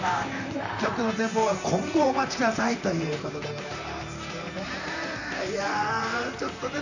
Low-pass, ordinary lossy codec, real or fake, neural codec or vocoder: 7.2 kHz; none; fake; vocoder, 44.1 kHz, 128 mel bands, Pupu-Vocoder